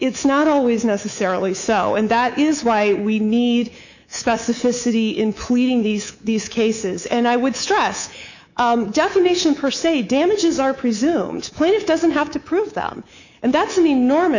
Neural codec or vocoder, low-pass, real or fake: codec, 24 kHz, 3.1 kbps, DualCodec; 7.2 kHz; fake